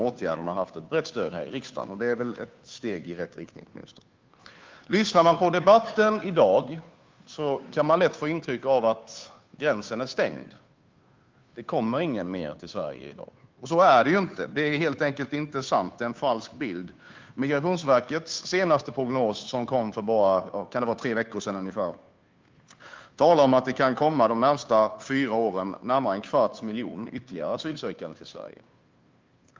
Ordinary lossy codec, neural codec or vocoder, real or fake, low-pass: Opus, 24 kbps; codec, 16 kHz, 2 kbps, FunCodec, trained on Chinese and English, 25 frames a second; fake; 7.2 kHz